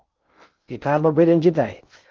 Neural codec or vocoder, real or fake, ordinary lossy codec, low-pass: codec, 16 kHz in and 24 kHz out, 0.6 kbps, FocalCodec, streaming, 2048 codes; fake; Opus, 24 kbps; 7.2 kHz